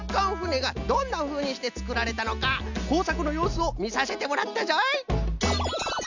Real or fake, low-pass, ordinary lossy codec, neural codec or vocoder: real; 7.2 kHz; none; none